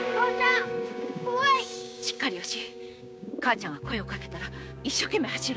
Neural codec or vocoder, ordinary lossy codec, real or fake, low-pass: codec, 16 kHz, 6 kbps, DAC; none; fake; none